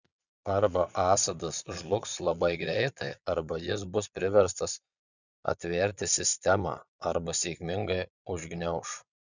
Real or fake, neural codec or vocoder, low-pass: fake; vocoder, 22.05 kHz, 80 mel bands, Vocos; 7.2 kHz